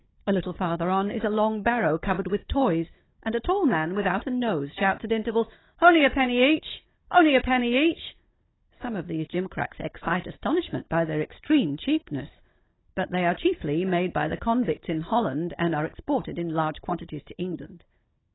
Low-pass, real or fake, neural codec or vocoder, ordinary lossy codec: 7.2 kHz; fake; codec, 16 kHz, 16 kbps, FunCodec, trained on Chinese and English, 50 frames a second; AAC, 16 kbps